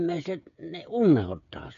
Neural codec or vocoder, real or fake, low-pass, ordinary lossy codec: codec, 16 kHz, 8 kbps, FreqCodec, smaller model; fake; 7.2 kHz; none